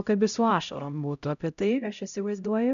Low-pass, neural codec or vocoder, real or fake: 7.2 kHz; codec, 16 kHz, 0.5 kbps, X-Codec, HuBERT features, trained on LibriSpeech; fake